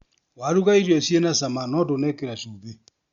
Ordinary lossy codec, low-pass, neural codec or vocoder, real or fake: Opus, 64 kbps; 7.2 kHz; none; real